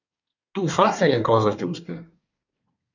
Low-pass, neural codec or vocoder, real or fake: 7.2 kHz; codec, 24 kHz, 1 kbps, SNAC; fake